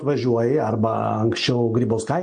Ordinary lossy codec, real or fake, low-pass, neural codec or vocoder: MP3, 48 kbps; real; 9.9 kHz; none